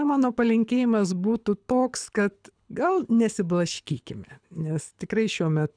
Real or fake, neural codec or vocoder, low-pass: fake; codec, 24 kHz, 6 kbps, HILCodec; 9.9 kHz